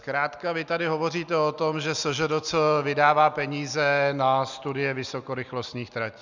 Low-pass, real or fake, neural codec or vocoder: 7.2 kHz; real; none